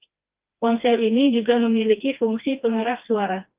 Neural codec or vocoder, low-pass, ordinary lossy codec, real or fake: codec, 16 kHz, 2 kbps, FreqCodec, smaller model; 3.6 kHz; Opus, 32 kbps; fake